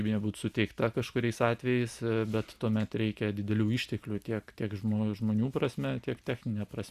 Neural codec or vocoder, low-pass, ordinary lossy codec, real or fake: none; 14.4 kHz; Opus, 64 kbps; real